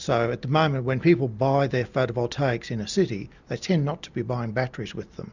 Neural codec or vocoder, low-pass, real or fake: none; 7.2 kHz; real